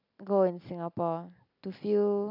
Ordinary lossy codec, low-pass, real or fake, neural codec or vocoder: none; 5.4 kHz; real; none